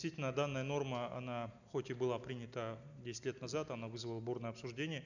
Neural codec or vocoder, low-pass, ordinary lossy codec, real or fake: none; 7.2 kHz; none; real